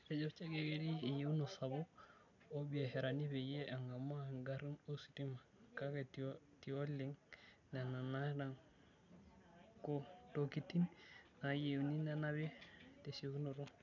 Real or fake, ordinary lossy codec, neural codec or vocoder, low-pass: real; none; none; 7.2 kHz